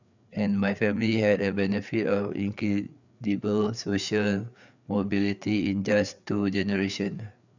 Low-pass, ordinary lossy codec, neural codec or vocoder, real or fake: 7.2 kHz; none; codec, 16 kHz, 4 kbps, FreqCodec, larger model; fake